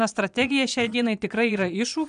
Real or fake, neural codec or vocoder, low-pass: fake; vocoder, 22.05 kHz, 80 mel bands, Vocos; 9.9 kHz